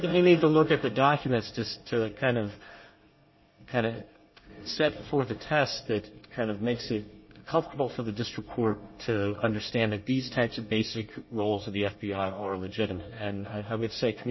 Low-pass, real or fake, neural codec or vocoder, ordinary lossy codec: 7.2 kHz; fake; codec, 24 kHz, 1 kbps, SNAC; MP3, 24 kbps